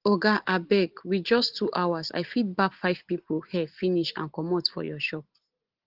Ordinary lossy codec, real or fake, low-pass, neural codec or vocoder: Opus, 16 kbps; real; 5.4 kHz; none